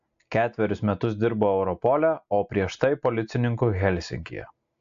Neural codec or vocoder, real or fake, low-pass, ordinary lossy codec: none; real; 7.2 kHz; AAC, 64 kbps